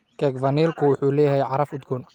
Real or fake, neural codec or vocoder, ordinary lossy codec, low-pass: real; none; Opus, 32 kbps; 19.8 kHz